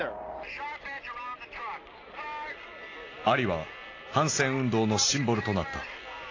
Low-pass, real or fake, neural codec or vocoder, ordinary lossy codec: 7.2 kHz; real; none; AAC, 32 kbps